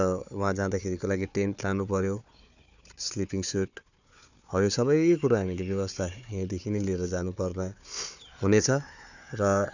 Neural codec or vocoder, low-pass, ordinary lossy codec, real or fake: codec, 16 kHz, 4 kbps, FunCodec, trained on Chinese and English, 50 frames a second; 7.2 kHz; none; fake